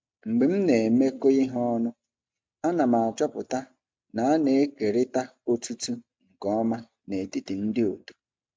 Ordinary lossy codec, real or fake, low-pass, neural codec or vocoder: none; real; none; none